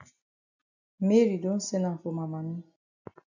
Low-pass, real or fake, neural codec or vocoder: 7.2 kHz; real; none